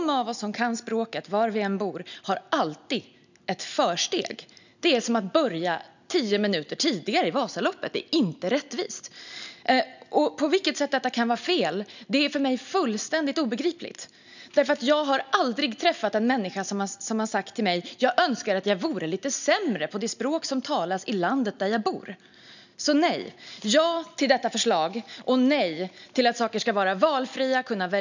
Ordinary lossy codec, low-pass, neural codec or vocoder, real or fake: none; 7.2 kHz; none; real